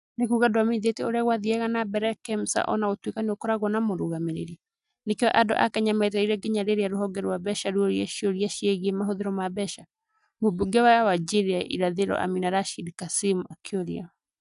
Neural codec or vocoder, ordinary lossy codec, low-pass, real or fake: none; none; 10.8 kHz; real